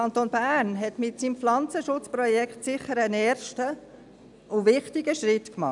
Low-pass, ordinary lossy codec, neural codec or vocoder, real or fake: 10.8 kHz; none; none; real